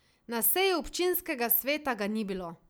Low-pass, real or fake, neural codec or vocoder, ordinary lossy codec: none; real; none; none